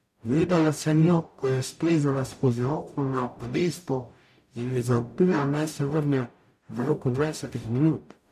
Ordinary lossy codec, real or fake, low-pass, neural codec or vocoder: AAC, 96 kbps; fake; 14.4 kHz; codec, 44.1 kHz, 0.9 kbps, DAC